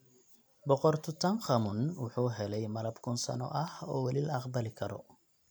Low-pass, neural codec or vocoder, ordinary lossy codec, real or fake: none; none; none; real